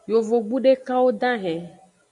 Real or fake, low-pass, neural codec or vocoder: real; 10.8 kHz; none